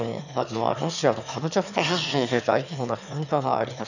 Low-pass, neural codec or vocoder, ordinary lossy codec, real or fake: 7.2 kHz; autoencoder, 22.05 kHz, a latent of 192 numbers a frame, VITS, trained on one speaker; none; fake